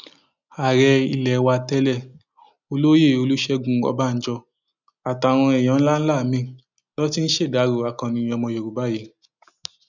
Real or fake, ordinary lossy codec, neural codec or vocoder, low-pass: real; none; none; 7.2 kHz